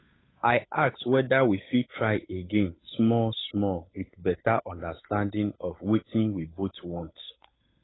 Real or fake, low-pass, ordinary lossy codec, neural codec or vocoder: fake; 7.2 kHz; AAC, 16 kbps; codec, 16 kHz, 8 kbps, FunCodec, trained on Chinese and English, 25 frames a second